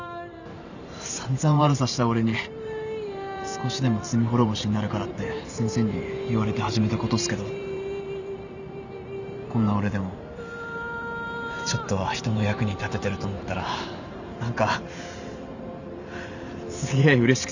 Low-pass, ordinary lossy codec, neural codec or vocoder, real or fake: 7.2 kHz; none; vocoder, 44.1 kHz, 128 mel bands every 512 samples, BigVGAN v2; fake